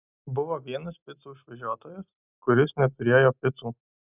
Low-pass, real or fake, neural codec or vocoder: 3.6 kHz; real; none